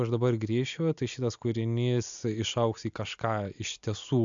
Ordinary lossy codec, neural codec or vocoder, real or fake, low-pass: MP3, 64 kbps; none; real; 7.2 kHz